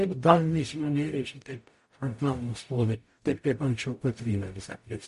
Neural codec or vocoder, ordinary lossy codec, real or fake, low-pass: codec, 44.1 kHz, 0.9 kbps, DAC; MP3, 48 kbps; fake; 14.4 kHz